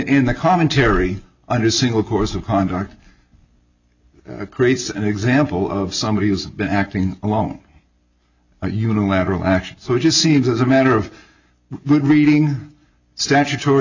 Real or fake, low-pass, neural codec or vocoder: real; 7.2 kHz; none